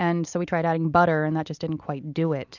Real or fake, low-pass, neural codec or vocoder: real; 7.2 kHz; none